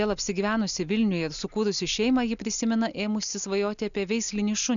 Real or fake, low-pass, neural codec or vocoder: real; 7.2 kHz; none